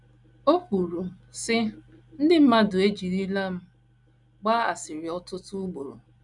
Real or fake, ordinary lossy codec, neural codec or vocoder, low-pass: real; none; none; 10.8 kHz